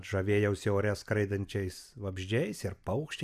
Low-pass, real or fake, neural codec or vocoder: 14.4 kHz; real; none